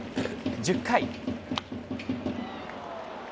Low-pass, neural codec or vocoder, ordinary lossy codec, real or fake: none; none; none; real